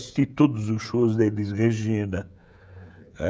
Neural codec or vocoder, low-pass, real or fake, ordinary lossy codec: codec, 16 kHz, 16 kbps, FreqCodec, smaller model; none; fake; none